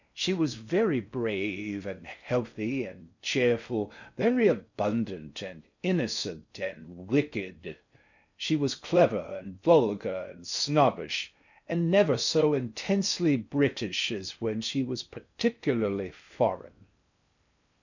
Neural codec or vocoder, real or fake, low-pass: codec, 16 kHz in and 24 kHz out, 0.6 kbps, FocalCodec, streaming, 4096 codes; fake; 7.2 kHz